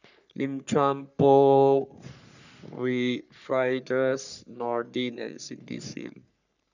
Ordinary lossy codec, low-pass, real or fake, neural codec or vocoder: none; 7.2 kHz; fake; codec, 44.1 kHz, 3.4 kbps, Pupu-Codec